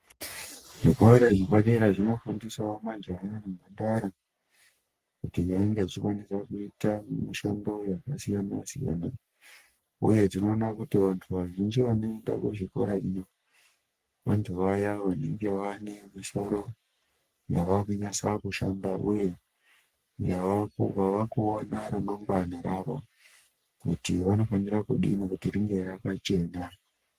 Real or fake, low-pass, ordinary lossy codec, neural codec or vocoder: fake; 14.4 kHz; Opus, 32 kbps; codec, 44.1 kHz, 3.4 kbps, Pupu-Codec